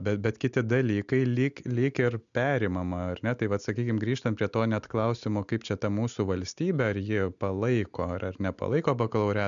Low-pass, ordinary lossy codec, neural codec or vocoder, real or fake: 7.2 kHz; MP3, 96 kbps; none; real